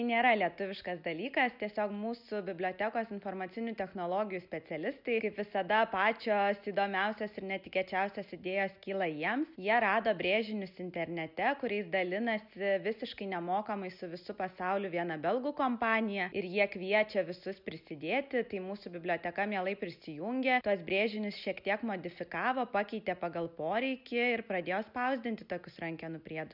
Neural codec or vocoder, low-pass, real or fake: none; 5.4 kHz; real